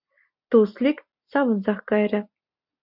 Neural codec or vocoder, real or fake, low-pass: none; real; 5.4 kHz